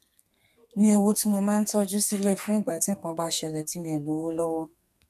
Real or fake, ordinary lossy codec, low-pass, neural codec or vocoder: fake; none; 14.4 kHz; codec, 32 kHz, 1.9 kbps, SNAC